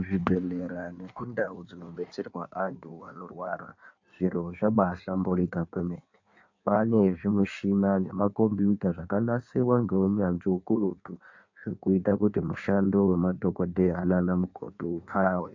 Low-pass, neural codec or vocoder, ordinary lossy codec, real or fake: 7.2 kHz; codec, 16 kHz in and 24 kHz out, 1.1 kbps, FireRedTTS-2 codec; AAC, 48 kbps; fake